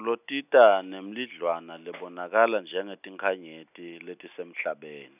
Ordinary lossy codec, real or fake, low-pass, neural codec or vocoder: none; real; 3.6 kHz; none